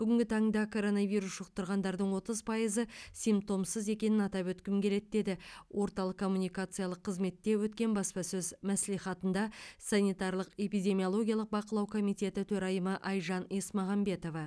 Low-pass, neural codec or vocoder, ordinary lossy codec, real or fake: none; none; none; real